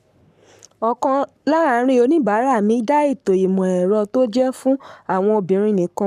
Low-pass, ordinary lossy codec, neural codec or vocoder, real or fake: 14.4 kHz; none; codec, 44.1 kHz, 7.8 kbps, Pupu-Codec; fake